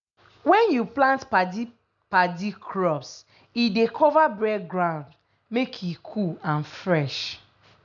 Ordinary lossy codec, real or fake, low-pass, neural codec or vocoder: none; real; 7.2 kHz; none